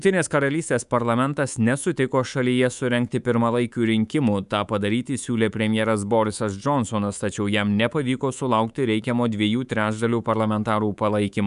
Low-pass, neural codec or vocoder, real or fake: 10.8 kHz; codec, 24 kHz, 3.1 kbps, DualCodec; fake